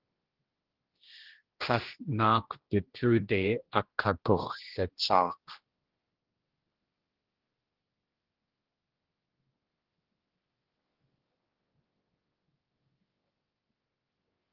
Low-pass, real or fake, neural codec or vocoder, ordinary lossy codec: 5.4 kHz; fake; codec, 16 kHz, 1 kbps, X-Codec, HuBERT features, trained on general audio; Opus, 16 kbps